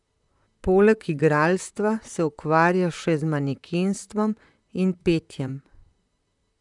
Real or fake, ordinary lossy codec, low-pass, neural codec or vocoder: fake; none; 10.8 kHz; vocoder, 44.1 kHz, 128 mel bands, Pupu-Vocoder